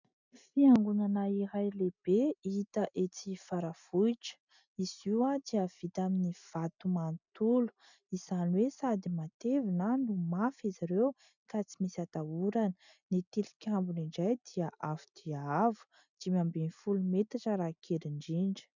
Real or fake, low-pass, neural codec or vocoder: real; 7.2 kHz; none